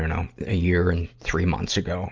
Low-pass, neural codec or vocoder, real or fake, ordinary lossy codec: 7.2 kHz; none; real; Opus, 24 kbps